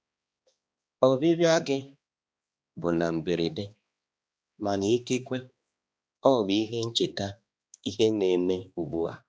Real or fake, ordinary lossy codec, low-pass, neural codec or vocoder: fake; none; none; codec, 16 kHz, 2 kbps, X-Codec, HuBERT features, trained on balanced general audio